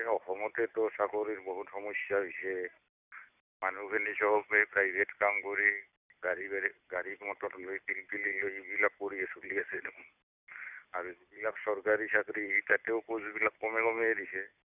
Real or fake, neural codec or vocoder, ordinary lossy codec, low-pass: real; none; none; 3.6 kHz